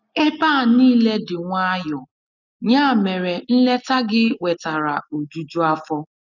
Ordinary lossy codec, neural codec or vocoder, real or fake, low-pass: none; none; real; 7.2 kHz